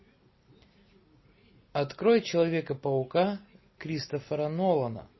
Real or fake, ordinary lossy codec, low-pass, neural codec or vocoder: real; MP3, 24 kbps; 7.2 kHz; none